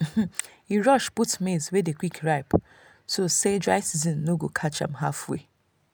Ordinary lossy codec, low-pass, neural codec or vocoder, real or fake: none; none; none; real